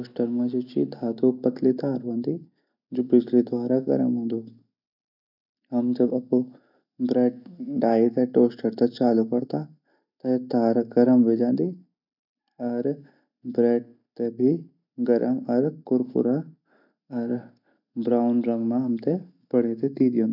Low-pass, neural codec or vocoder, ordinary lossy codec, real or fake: 5.4 kHz; none; none; real